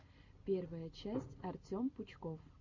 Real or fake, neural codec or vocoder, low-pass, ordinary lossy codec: real; none; 7.2 kHz; MP3, 48 kbps